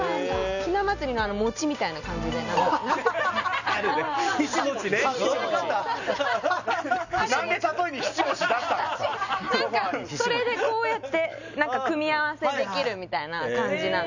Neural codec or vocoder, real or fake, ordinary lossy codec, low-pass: none; real; none; 7.2 kHz